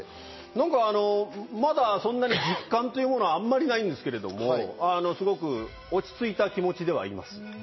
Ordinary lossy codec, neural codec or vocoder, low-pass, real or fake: MP3, 24 kbps; none; 7.2 kHz; real